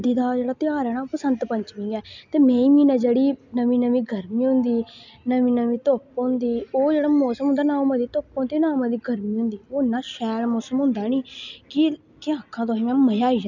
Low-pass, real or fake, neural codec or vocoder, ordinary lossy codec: 7.2 kHz; real; none; none